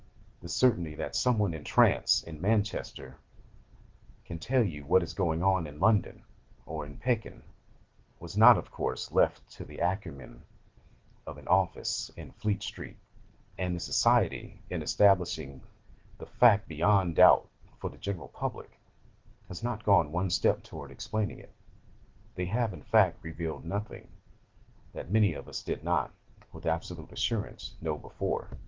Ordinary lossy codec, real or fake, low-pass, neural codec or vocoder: Opus, 16 kbps; real; 7.2 kHz; none